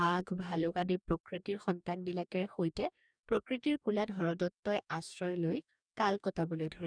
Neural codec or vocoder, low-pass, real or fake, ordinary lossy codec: codec, 44.1 kHz, 2.6 kbps, DAC; 9.9 kHz; fake; none